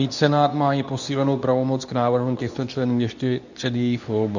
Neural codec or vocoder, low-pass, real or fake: codec, 24 kHz, 0.9 kbps, WavTokenizer, medium speech release version 2; 7.2 kHz; fake